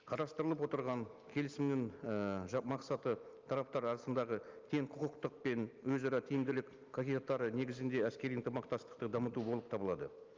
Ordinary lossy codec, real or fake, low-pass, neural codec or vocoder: Opus, 24 kbps; real; 7.2 kHz; none